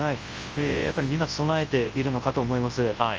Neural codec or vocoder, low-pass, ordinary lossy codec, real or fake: codec, 24 kHz, 0.9 kbps, WavTokenizer, large speech release; 7.2 kHz; Opus, 24 kbps; fake